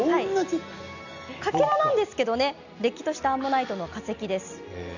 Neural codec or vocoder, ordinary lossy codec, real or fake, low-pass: none; none; real; 7.2 kHz